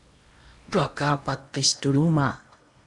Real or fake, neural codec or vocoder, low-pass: fake; codec, 16 kHz in and 24 kHz out, 0.8 kbps, FocalCodec, streaming, 65536 codes; 10.8 kHz